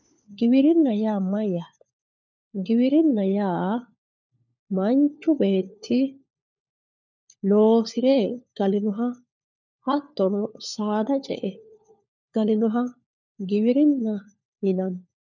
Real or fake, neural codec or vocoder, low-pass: fake; codec, 16 kHz, 4 kbps, FunCodec, trained on LibriTTS, 50 frames a second; 7.2 kHz